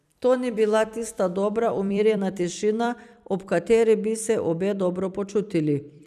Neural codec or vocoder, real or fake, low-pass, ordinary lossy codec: vocoder, 44.1 kHz, 128 mel bands every 256 samples, BigVGAN v2; fake; 14.4 kHz; none